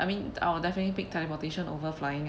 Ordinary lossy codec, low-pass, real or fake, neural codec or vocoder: none; none; real; none